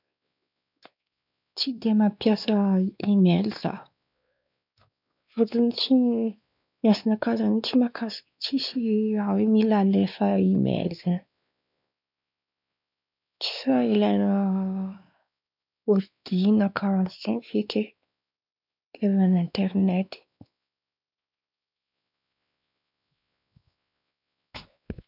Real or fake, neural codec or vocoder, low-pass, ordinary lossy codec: fake; codec, 16 kHz, 2 kbps, X-Codec, WavLM features, trained on Multilingual LibriSpeech; 5.4 kHz; none